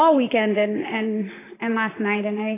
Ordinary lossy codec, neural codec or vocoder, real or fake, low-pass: AAC, 16 kbps; vocoder, 44.1 kHz, 128 mel bands, Pupu-Vocoder; fake; 3.6 kHz